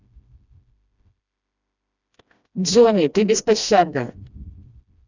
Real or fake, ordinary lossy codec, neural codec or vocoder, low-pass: fake; none; codec, 16 kHz, 1 kbps, FreqCodec, smaller model; 7.2 kHz